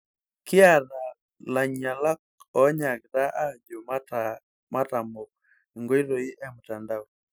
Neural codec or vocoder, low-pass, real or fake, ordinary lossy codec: none; none; real; none